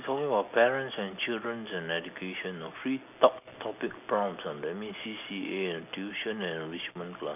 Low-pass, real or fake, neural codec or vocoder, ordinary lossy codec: 3.6 kHz; real; none; none